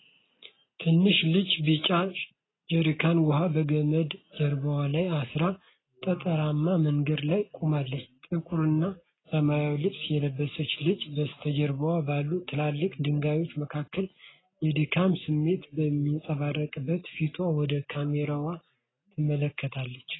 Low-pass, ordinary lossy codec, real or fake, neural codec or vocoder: 7.2 kHz; AAC, 16 kbps; real; none